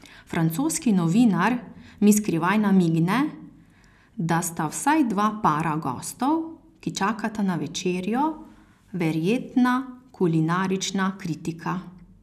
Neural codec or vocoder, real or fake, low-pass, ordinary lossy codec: none; real; 14.4 kHz; none